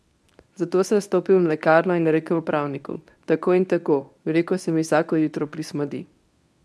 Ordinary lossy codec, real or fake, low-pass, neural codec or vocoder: none; fake; none; codec, 24 kHz, 0.9 kbps, WavTokenizer, medium speech release version 1